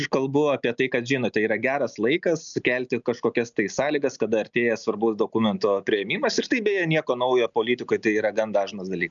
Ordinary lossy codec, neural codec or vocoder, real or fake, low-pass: MP3, 96 kbps; none; real; 7.2 kHz